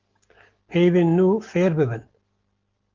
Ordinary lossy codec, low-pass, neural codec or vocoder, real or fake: Opus, 16 kbps; 7.2 kHz; none; real